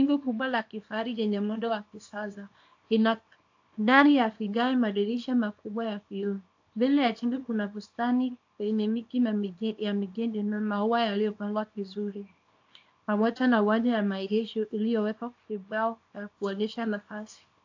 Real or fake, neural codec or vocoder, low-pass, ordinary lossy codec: fake; codec, 24 kHz, 0.9 kbps, WavTokenizer, small release; 7.2 kHz; AAC, 48 kbps